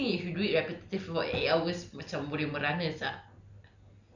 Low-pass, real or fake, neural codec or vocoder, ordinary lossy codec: 7.2 kHz; real; none; none